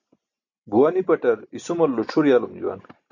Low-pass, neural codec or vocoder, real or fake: 7.2 kHz; none; real